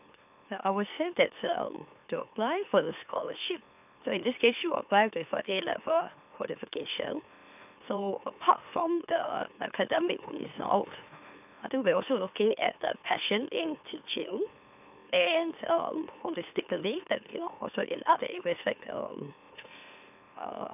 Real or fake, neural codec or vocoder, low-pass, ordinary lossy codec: fake; autoencoder, 44.1 kHz, a latent of 192 numbers a frame, MeloTTS; 3.6 kHz; none